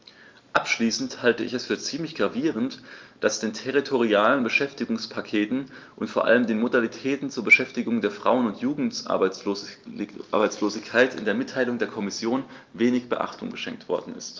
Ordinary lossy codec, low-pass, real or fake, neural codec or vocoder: Opus, 32 kbps; 7.2 kHz; real; none